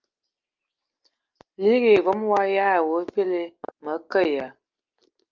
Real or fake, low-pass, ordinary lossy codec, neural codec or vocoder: real; 7.2 kHz; Opus, 24 kbps; none